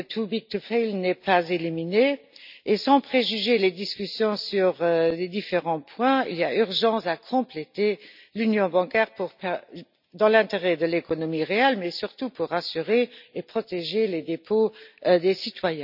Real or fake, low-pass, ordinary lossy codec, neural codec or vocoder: real; 5.4 kHz; none; none